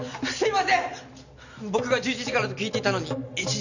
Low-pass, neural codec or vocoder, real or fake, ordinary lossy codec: 7.2 kHz; none; real; none